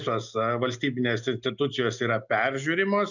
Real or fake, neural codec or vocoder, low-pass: real; none; 7.2 kHz